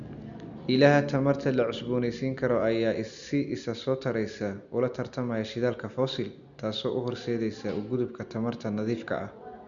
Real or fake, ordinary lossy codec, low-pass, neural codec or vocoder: real; Opus, 64 kbps; 7.2 kHz; none